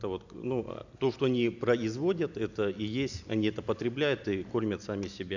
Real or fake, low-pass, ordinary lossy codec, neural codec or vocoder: real; 7.2 kHz; none; none